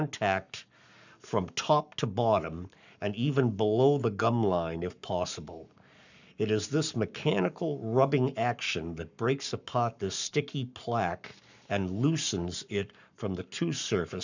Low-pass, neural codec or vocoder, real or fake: 7.2 kHz; codec, 44.1 kHz, 7.8 kbps, Pupu-Codec; fake